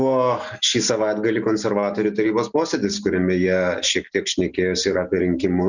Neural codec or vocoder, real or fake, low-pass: none; real; 7.2 kHz